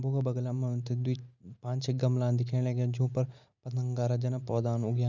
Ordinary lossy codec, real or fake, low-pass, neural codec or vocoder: none; real; 7.2 kHz; none